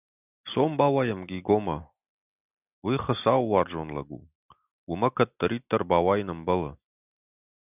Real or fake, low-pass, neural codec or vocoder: real; 3.6 kHz; none